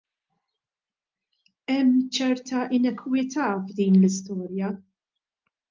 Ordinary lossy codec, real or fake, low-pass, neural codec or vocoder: Opus, 24 kbps; real; 7.2 kHz; none